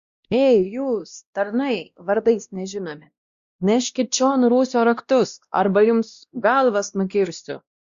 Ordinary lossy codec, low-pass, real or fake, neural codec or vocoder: Opus, 64 kbps; 7.2 kHz; fake; codec, 16 kHz, 1 kbps, X-Codec, WavLM features, trained on Multilingual LibriSpeech